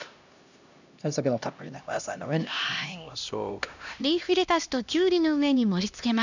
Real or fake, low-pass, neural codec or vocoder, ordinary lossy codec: fake; 7.2 kHz; codec, 16 kHz, 1 kbps, X-Codec, HuBERT features, trained on LibriSpeech; none